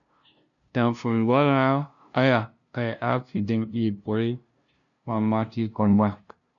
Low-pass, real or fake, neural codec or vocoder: 7.2 kHz; fake; codec, 16 kHz, 0.5 kbps, FunCodec, trained on LibriTTS, 25 frames a second